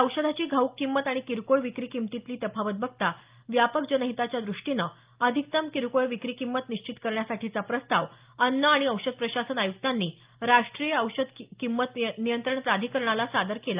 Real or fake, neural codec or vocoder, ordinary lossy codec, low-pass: real; none; Opus, 24 kbps; 3.6 kHz